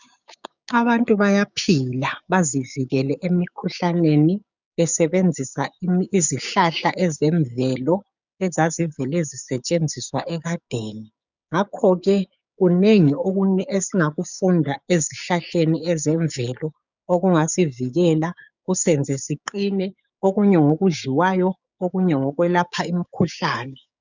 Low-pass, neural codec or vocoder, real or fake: 7.2 kHz; codec, 16 kHz, 6 kbps, DAC; fake